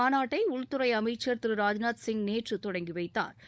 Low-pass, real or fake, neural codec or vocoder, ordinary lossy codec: none; fake; codec, 16 kHz, 16 kbps, FunCodec, trained on LibriTTS, 50 frames a second; none